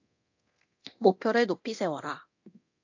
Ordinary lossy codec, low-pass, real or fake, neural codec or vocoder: AAC, 48 kbps; 7.2 kHz; fake; codec, 24 kHz, 0.9 kbps, DualCodec